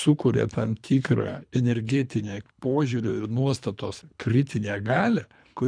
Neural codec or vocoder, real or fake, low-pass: codec, 24 kHz, 3 kbps, HILCodec; fake; 9.9 kHz